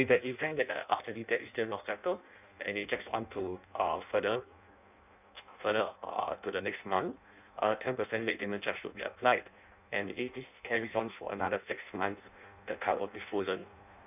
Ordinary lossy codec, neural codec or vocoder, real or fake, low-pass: none; codec, 16 kHz in and 24 kHz out, 0.6 kbps, FireRedTTS-2 codec; fake; 3.6 kHz